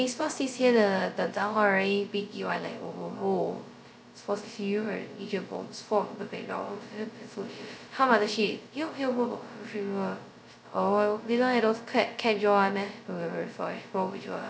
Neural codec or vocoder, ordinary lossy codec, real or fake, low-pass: codec, 16 kHz, 0.2 kbps, FocalCodec; none; fake; none